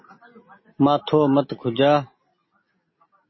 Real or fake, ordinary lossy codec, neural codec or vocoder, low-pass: real; MP3, 24 kbps; none; 7.2 kHz